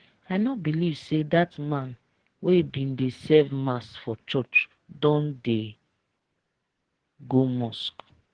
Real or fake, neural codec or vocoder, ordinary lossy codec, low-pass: fake; codec, 44.1 kHz, 2.6 kbps, SNAC; Opus, 16 kbps; 9.9 kHz